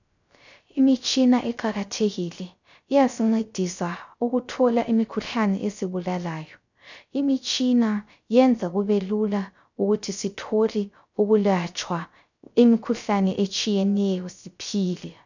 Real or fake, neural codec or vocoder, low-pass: fake; codec, 16 kHz, 0.3 kbps, FocalCodec; 7.2 kHz